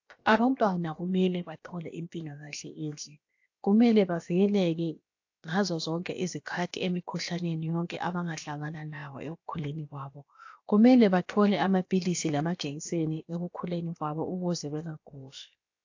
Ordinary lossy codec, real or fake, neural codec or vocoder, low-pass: AAC, 48 kbps; fake; codec, 16 kHz, about 1 kbps, DyCAST, with the encoder's durations; 7.2 kHz